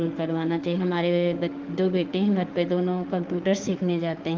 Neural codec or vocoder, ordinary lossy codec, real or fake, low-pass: autoencoder, 48 kHz, 32 numbers a frame, DAC-VAE, trained on Japanese speech; Opus, 32 kbps; fake; 7.2 kHz